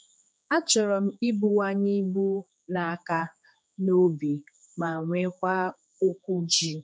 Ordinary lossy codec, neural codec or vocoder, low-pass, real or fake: none; codec, 16 kHz, 4 kbps, X-Codec, HuBERT features, trained on general audio; none; fake